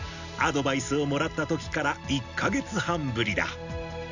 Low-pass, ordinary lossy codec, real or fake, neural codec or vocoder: 7.2 kHz; none; real; none